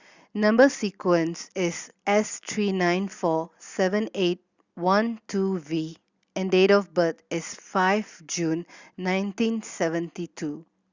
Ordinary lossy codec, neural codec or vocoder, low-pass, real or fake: Opus, 64 kbps; none; 7.2 kHz; real